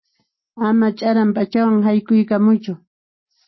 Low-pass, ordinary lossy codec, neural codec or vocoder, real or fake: 7.2 kHz; MP3, 24 kbps; none; real